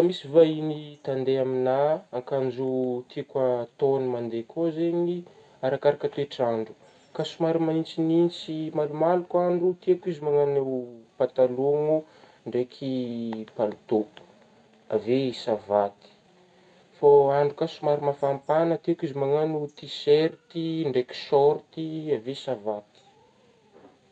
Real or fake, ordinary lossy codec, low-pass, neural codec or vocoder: real; none; 9.9 kHz; none